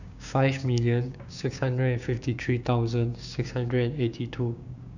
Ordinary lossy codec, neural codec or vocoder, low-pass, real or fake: none; codec, 16 kHz, 6 kbps, DAC; 7.2 kHz; fake